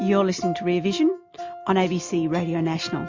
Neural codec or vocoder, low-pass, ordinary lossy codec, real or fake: none; 7.2 kHz; MP3, 48 kbps; real